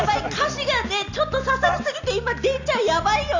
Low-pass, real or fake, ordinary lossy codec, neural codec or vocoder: 7.2 kHz; real; Opus, 64 kbps; none